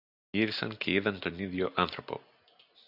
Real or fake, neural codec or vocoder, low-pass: real; none; 5.4 kHz